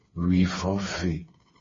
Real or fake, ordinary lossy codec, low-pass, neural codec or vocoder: fake; MP3, 32 kbps; 7.2 kHz; codec, 16 kHz, 4 kbps, FreqCodec, smaller model